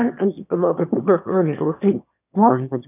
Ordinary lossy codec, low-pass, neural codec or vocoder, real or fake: none; 3.6 kHz; autoencoder, 22.05 kHz, a latent of 192 numbers a frame, VITS, trained on one speaker; fake